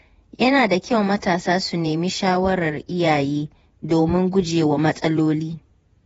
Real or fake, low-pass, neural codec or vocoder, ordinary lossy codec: fake; 19.8 kHz; vocoder, 48 kHz, 128 mel bands, Vocos; AAC, 24 kbps